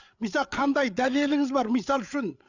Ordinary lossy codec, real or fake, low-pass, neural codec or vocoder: none; fake; 7.2 kHz; codec, 16 kHz, 8 kbps, FreqCodec, larger model